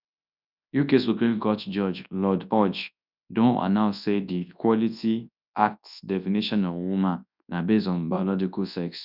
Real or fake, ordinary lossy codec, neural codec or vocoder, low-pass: fake; none; codec, 24 kHz, 0.9 kbps, WavTokenizer, large speech release; 5.4 kHz